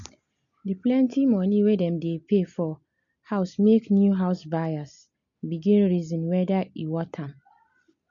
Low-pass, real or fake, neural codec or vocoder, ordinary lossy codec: 7.2 kHz; real; none; none